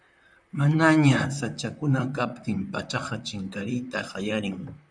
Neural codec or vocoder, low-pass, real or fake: vocoder, 44.1 kHz, 128 mel bands, Pupu-Vocoder; 9.9 kHz; fake